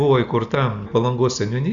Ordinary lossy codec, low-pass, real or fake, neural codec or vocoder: Opus, 64 kbps; 7.2 kHz; real; none